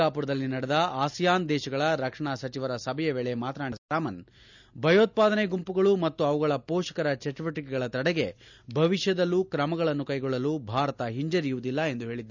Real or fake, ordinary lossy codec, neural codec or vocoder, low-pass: real; none; none; 7.2 kHz